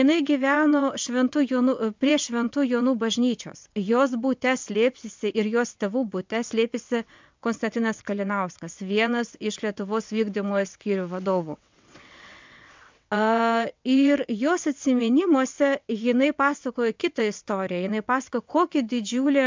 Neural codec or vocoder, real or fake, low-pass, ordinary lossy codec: vocoder, 22.05 kHz, 80 mel bands, WaveNeXt; fake; 7.2 kHz; MP3, 64 kbps